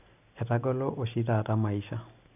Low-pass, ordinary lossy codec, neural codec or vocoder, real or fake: 3.6 kHz; none; none; real